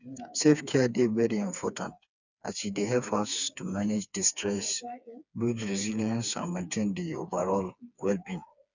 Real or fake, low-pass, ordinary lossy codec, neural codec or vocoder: fake; 7.2 kHz; none; codec, 16 kHz, 4 kbps, FreqCodec, smaller model